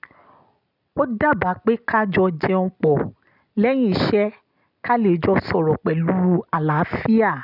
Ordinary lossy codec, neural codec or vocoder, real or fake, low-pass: none; none; real; 5.4 kHz